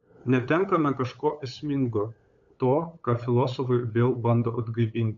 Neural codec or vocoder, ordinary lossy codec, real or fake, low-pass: codec, 16 kHz, 8 kbps, FunCodec, trained on LibriTTS, 25 frames a second; AAC, 64 kbps; fake; 7.2 kHz